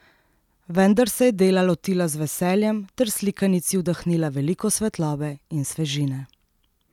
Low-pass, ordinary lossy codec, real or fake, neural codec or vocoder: 19.8 kHz; none; real; none